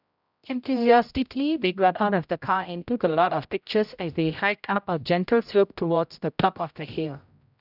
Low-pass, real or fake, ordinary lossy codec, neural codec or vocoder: 5.4 kHz; fake; none; codec, 16 kHz, 0.5 kbps, X-Codec, HuBERT features, trained on general audio